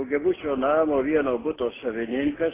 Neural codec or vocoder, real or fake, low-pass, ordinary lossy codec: none; real; 3.6 kHz; AAC, 16 kbps